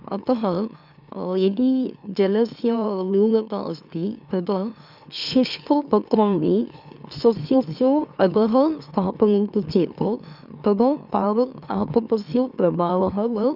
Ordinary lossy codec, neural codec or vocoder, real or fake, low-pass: none; autoencoder, 44.1 kHz, a latent of 192 numbers a frame, MeloTTS; fake; 5.4 kHz